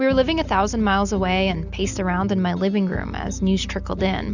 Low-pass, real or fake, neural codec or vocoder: 7.2 kHz; real; none